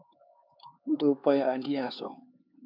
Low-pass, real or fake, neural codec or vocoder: 5.4 kHz; fake; codec, 16 kHz, 4 kbps, X-Codec, HuBERT features, trained on LibriSpeech